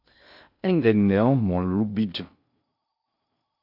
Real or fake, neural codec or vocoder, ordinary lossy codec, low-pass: fake; codec, 16 kHz in and 24 kHz out, 0.6 kbps, FocalCodec, streaming, 2048 codes; Opus, 64 kbps; 5.4 kHz